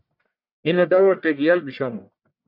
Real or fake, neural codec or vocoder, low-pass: fake; codec, 44.1 kHz, 1.7 kbps, Pupu-Codec; 5.4 kHz